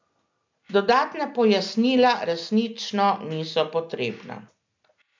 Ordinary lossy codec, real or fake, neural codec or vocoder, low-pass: MP3, 64 kbps; real; none; 7.2 kHz